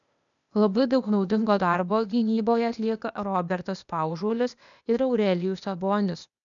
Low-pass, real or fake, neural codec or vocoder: 7.2 kHz; fake; codec, 16 kHz, 0.8 kbps, ZipCodec